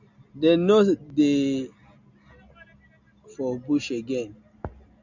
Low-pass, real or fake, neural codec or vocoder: 7.2 kHz; real; none